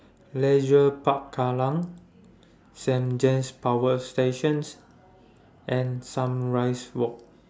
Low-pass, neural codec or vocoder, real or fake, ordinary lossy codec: none; none; real; none